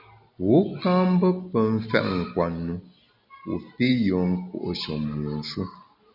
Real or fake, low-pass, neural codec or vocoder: real; 5.4 kHz; none